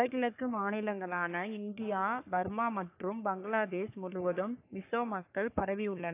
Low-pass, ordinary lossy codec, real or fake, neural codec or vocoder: 3.6 kHz; AAC, 24 kbps; fake; codec, 44.1 kHz, 3.4 kbps, Pupu-Codec